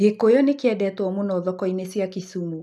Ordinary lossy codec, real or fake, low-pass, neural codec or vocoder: none; real; none; none